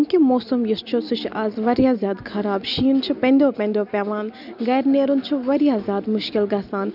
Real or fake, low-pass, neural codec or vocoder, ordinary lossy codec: real; 5.4 kHz; none; none